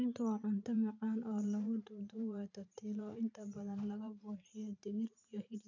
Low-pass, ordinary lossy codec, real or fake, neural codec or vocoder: 7.2 kHz; none; fake; vocoder, 44.1 kHz, 128 mel bands every 512 samples, BigVGAN v2